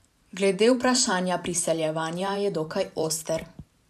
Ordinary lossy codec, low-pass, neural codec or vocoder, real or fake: none; 14.4 kHz; vocoder, 44.1 kHz, 128 mel bands every 512 samples, BigVGAN v2; fake